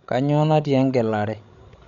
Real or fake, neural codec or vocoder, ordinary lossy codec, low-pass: fake; codec, 16 kHz, 16 kbps, FreqCodec, larger model; none; 7.2 kHz